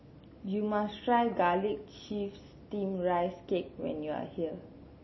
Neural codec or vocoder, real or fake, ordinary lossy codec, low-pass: none; real; MP3, 24 kbps; 7.2 kHz